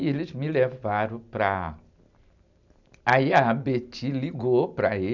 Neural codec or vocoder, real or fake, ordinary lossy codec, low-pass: none; real; none; 7.2 kHz